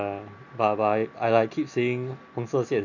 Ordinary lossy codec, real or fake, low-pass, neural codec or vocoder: none; real; 7.2 kHz; none